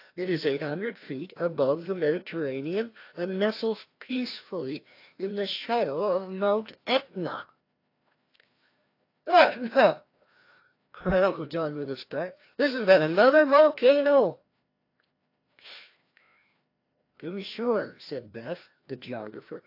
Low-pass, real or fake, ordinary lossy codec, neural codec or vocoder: 5.4 kHz; fake; AAC, 32 kbps; codec, 16 kHz, 1 kbps, FreqCodec, larger model